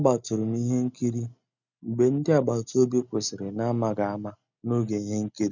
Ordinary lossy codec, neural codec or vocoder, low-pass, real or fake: none; none; 7.2 kHz; real